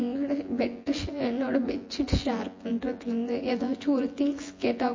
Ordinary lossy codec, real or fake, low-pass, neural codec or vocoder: MP3, 32 kbps; fake; 7.2 kHz; vocoder, 24 kHz, 100 mel bands, Vocos